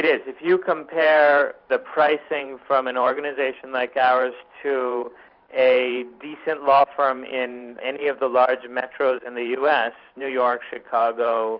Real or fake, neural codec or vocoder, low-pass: fake; vocoder, 44.1 kHz, 128 mel bands every 512 samples, BigVGAN v2; 5.4 kHz